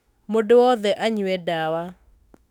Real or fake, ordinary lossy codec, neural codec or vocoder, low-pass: fake; none; autoencoder, 48 kHz, 32 numbers a frame, DAC-VAE, trained on Japanese speech; 19.8 kHz